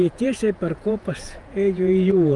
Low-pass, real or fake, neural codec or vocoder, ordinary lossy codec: 10.8 kHz; fake; vocoder, 48 kHz, 128 mel bands, Vocos; Opus, 32 kbps